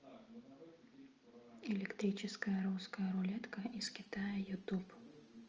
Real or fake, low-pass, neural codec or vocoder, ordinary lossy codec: real; 7.2 kHz; none; Opus, 24 kbps